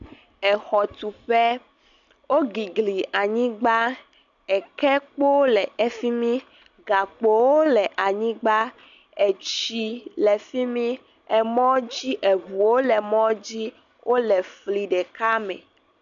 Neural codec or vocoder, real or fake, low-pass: none; real; 7.2 kHz